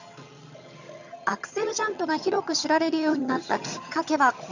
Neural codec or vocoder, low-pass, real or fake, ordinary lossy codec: vocoder, 22.05 kHz, 80 mel bands, HiFi-GAN; 7.2 kHz; fake; none